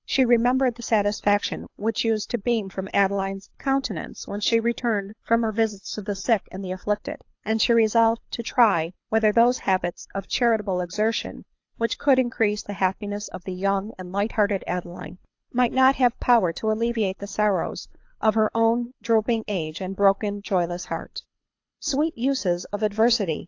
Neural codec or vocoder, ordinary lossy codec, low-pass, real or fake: codec, 24 kHz, 6 kbps, HILCodec; AAC, 48 kbps; 7.2 kHz; fake